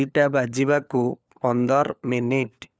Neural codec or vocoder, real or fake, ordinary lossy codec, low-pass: codec, 16 kHz, 4 kbps, FunCodec, trained on LibriTTS, 50 frames a second; fake; none; none